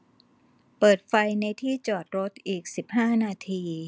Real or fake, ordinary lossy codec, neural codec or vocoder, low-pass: real; none; none; none